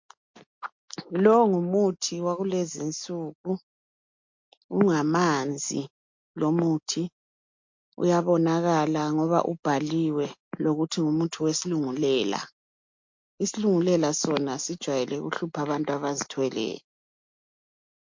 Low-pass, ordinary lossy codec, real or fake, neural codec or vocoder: 7.2 kHz; MP3, 48 kbps; real; none